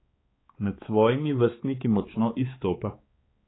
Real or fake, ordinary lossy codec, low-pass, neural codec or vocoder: fake; AAC, 16 kbps; 7.2 kHz; codec, 16 kHz, 4 kbps, X-Codec, HuBERT features, trained on balanced general audio